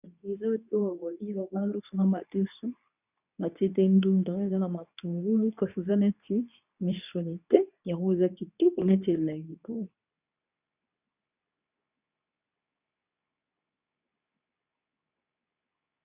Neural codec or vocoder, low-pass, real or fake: codec, 24 kHz, 0.9 kbps, WavTokenizer, medium speech release version 2; 3.6 kHz; fake